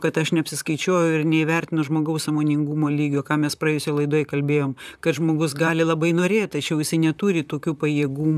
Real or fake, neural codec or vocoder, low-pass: fake; vocoder, 44.1 kHz, 128 mel bands, Pupu-Vocoder; 14.4 kHz